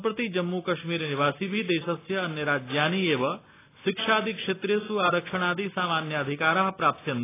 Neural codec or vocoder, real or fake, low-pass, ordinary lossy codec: none; real; 3.6 kHz; AAC, 16 kbps